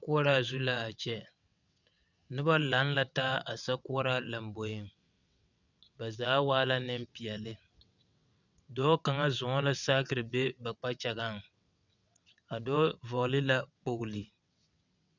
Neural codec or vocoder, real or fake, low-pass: vocoder, 22.05 kHz, 80 mel bands, WaveNeXt; fake; 7.2 kHz